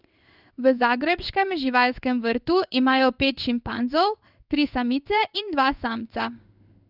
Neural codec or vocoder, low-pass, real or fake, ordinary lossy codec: codec, 16 kHz in and 24 kHz out, 1 kbps, XY-Tokenizer; 5.4 kHz; fake; none